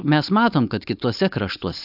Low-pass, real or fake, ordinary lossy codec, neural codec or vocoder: 5.4 kHz; fake; AAC, 48 kbps; codec, 16 kHz, 4.8 kbps, FACodec